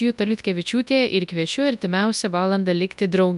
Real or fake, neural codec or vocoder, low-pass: fake; codec, 24 kHz, 0.9 kbps, WavTokenizer, large speech release; 10.8 kHz